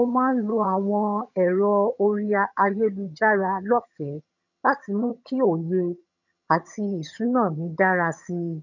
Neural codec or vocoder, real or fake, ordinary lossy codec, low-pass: vocoder, 22.05 kHz, 80 mel bands, HiFi-GAN; fake; none; 7.2 kHz